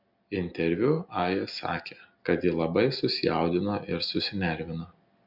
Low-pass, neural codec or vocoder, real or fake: 5.4 kHz; none; real